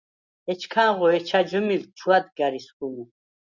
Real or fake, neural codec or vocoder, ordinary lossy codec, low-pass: real; none; Opus, 64 kbps; 7.2 kHz